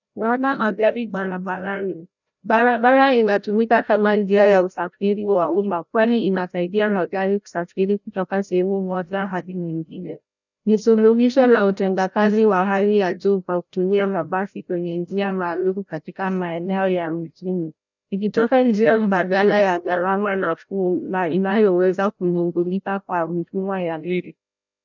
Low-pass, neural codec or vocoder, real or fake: 7.2 kHz; codec, 16 kHz, 0.5 kbps, FreqCodec, larger model; fake